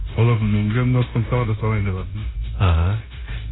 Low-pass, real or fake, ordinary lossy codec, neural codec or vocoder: 7.2 kHz; fake; AAC, 16 kbps; codec, 16 kHz, 0.9 kbps, LongCat-Audio-Codec